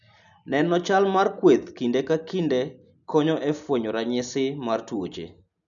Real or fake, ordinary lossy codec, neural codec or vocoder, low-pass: real; none; none; 7.2 kHz